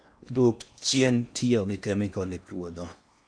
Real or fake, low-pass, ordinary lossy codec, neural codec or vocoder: fake; 9.9 kHz; none; codec, 16 kHz in and 24 kHz out, 0.8 kbps, FocalCodec, streaming, 65536 codes